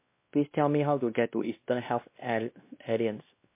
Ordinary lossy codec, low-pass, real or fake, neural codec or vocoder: MP3, 24 kbps; 3.6 kHz; fake; codec, 16 kHz, 1 kbps, X-Codec, WavLM features, trained on Multilingual LibriSpeech